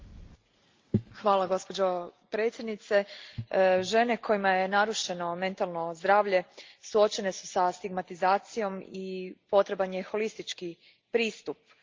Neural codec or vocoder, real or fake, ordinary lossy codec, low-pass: none; real; Opus, 32 kbps; 7.2 kHz